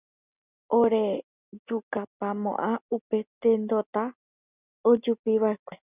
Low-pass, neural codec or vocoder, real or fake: 3.6 kHz; none; real